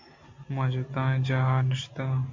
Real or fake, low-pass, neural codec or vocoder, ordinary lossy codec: real; 7.2 kHz; none; MP3, 48 kbps